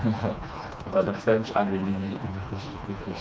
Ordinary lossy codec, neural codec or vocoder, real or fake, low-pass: none; codec, 16 kHz, 2 kbps, FreqCodec, smaller model; fake; none